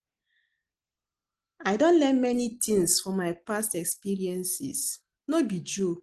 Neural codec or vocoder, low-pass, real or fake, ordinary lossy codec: none; 10.8 kHz; real; Opus, 16 kbps